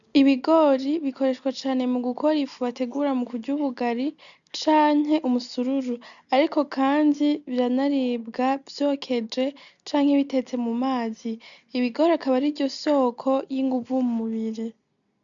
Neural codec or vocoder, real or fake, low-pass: none; real; 7.2 kHz